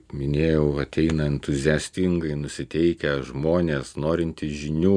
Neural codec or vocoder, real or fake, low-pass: none; real; 9.9 kHz